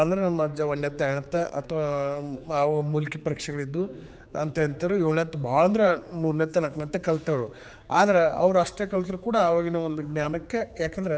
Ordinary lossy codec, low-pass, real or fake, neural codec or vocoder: none; none; fake; codec, 16 kHz, 4 kbps, X-Codec, HuBERT features, trained on general audio